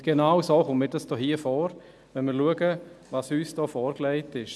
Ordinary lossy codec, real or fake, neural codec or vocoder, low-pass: none; real; none; none